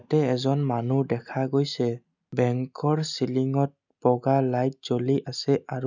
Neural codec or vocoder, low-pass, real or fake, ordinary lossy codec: none; 7.2 kHz; real; none